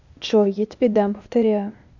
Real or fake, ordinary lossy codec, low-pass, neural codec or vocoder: fake; none; 7.2 kHz; codec, 16 kHz, 0.8 kbps, ZipCodec